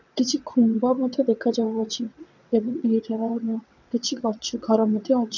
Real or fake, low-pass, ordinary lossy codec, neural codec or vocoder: fake; 7.2 kHz; none; vocoder, 22.05 kHz, 80 mel bands, WaveNeXt